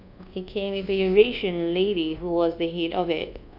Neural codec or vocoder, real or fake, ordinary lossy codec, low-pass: codec, 24 kHz, 1.2 kbps, DualCodec; fake; none; 5.4 kHz